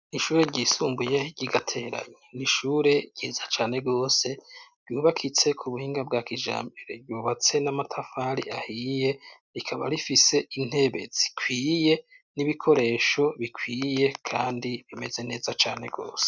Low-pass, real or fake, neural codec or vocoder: 7.2 kHz; real; none